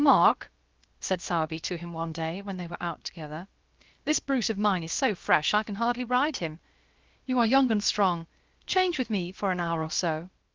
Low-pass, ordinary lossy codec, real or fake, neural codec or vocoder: 7.2 kHz; Opus, 32 kbps; fake; codec, 16 kHz, about 1 kbps, DyCAST, with the encoder's durations